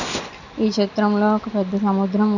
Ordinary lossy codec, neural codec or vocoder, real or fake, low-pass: none; none; real; 7.2 kHz